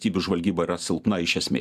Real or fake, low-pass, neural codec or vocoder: real; 14.4 kHz; none